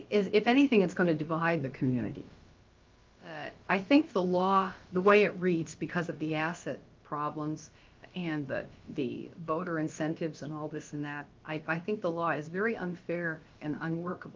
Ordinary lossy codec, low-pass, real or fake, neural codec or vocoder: Opus, 32 kbps; 7.2 kHz; fake; codec, 16 kHz, about 1 kbps, DyCAST, with the encoder's durations